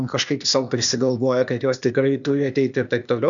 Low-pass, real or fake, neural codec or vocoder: 7.2 kHz; fake; codec, 16 kHz, 0.8 kbps, ZipCodec